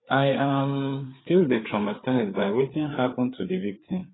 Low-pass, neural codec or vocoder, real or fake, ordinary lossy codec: 7.2 kHz; codec, 16 kHz, 4 kbps, FreqCodec, larger model; fake; AAC, 16 kbps